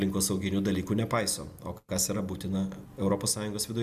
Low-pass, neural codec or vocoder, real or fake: 14.4 kHz; none; real